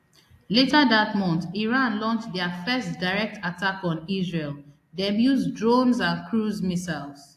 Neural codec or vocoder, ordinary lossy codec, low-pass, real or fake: none; AAC, 64 kbps; 14.4 kHz; real